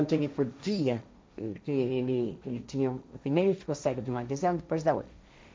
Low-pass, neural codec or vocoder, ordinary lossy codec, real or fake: none; codec, 16 kHz, 1.1 kbps, Voila-Tokenizer; none; fake